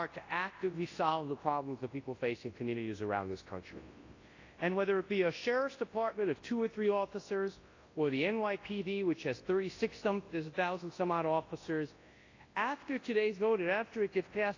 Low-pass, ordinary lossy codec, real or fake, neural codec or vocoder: 7.2 kHz; AAC, 32 kbps; fake; codec, 24 kHz, 0.9 kbps, WavTokenizer, large speech release